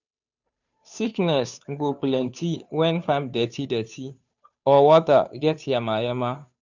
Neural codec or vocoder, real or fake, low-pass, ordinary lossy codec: codec, 16 kHz, 2 kbps, FunCodec, trained on Chinese and English, 25 frames a second; fake; 7.2 kHz; none